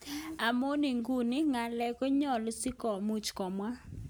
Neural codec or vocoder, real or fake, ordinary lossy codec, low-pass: none; real; none; none